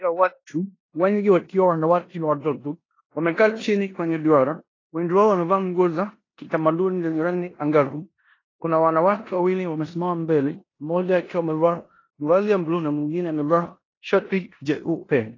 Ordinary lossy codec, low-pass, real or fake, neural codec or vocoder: AAC, 32 kbps; 7.2 kHz; fake; codec, 16 kHz in and 24 kHz out, 0.9 kbps, LongCat-Audio-Codec, four codebook decoder